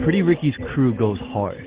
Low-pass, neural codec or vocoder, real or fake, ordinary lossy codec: 3.6 kHz; none; real; Opus, 16 kbps